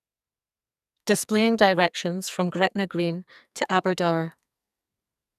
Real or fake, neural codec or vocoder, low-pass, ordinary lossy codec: fake; codec, 44.1 kHz, 2.6 kbps, SNAC; 14.4 kHz; none